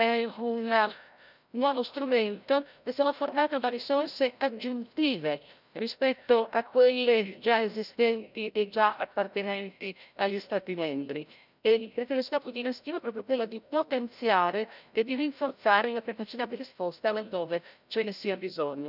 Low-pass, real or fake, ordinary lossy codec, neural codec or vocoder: 5.4 kHz; fake; none; codec, 16 kHz, 0.5 kbps, FreqCodec, larger model